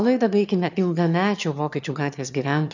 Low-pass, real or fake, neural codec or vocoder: 7.2 kHz; fake; autoencoder, 22.05 kHz, a latent of 192 numbers a frame, VITS, trained on one speaker